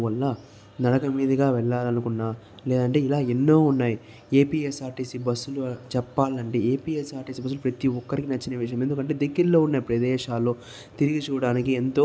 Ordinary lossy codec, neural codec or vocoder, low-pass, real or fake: none; none; none; real